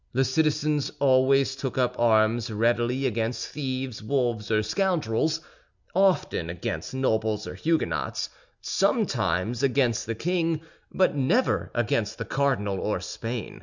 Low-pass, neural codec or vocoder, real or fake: 7.2 kHz; none; real